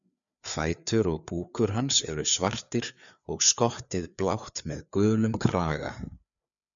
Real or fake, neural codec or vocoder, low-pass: fake; codec, 16 kHz, 4 kbps, FreqCodec, larger model; 7.2 kHz